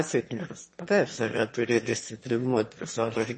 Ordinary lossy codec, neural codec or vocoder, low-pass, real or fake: MP3, 32 kbps; autoencoder, 22.05 kHz, a latent of 192 numbers a frame, VITS, trained on one speaker; 9.9 kHz; fake